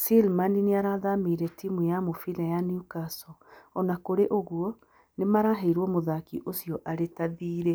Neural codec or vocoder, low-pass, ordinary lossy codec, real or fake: none; none; none; real